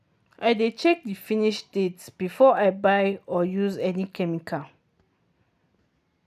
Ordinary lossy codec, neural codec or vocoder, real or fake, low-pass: none; none; real; 14.4 kHz